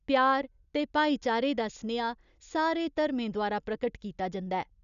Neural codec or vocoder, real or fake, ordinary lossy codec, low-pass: none; real; none; 7.2 kHz